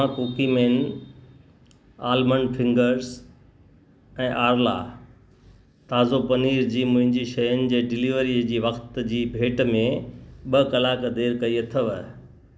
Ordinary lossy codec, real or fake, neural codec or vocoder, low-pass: none; real; none; none